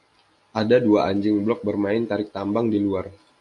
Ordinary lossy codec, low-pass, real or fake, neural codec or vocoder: AAC, 64 kbps; 10.8 kHz; real; none